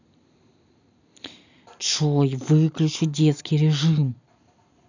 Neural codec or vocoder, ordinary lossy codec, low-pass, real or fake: none; none; 7.2 kHz; real